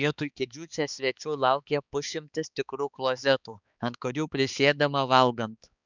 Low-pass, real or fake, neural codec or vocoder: 7.2 kHz; fake; codec, 16 kHz, 4 kbps, X-Codec, HuBERT features, trained on balanced general audio